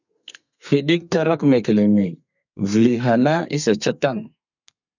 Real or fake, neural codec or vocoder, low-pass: fake; codec, 32 kHz, 1.9 kbps, SNAC; 7.2 kHz